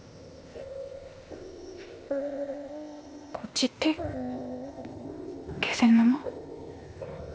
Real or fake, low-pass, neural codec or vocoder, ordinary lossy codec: fake; none; codec, 16 kHz, 0.8 kbps, ZipCodec; none